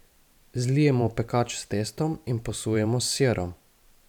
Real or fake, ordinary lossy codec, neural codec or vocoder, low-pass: fake; none; vocoder, 44.1 kHz, 128 mel bands every 512 samples, BigVGAN v2; 19.8 kHz